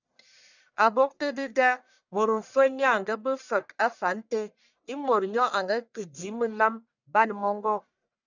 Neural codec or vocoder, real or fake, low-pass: codec, 44.1 kHz, 1.7 kbps, Pupu-Codec; fake; 7.2 kHz